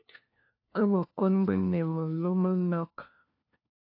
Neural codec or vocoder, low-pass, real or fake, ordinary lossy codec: codec, 16 kHz, 1 kbps, FunCodec, trained on LibriTTS, 50 frames a second; 5.4 kHz; fake; AAC, 32 kbps